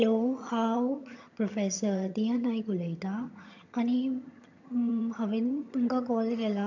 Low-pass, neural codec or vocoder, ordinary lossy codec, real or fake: 7.2 kHz; vocoder, 22.05 kHz, 80 mel bands, HiFi-GAN; AAC, 48 kbps; fake